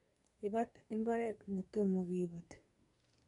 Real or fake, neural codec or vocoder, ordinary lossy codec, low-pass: fake; codec, 24 kHz, 1 kbps, SNAC; none; 10.8 kHz